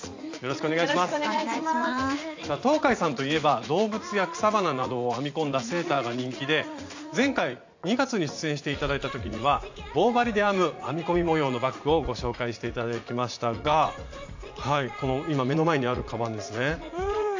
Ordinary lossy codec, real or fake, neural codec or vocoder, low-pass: AAC, 48 kbps; fake; vocoder, 44.1 kHz, 80 mel bands, Vocos; 7.2 kHz